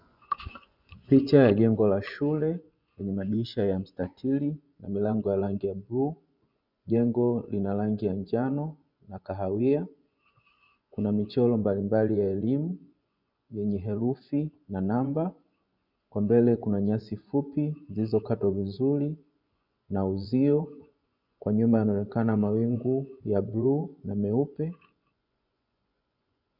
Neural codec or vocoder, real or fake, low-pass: vocoder, 24 kHz, 100 mel bands, Vocos; fake; 5.4 kHz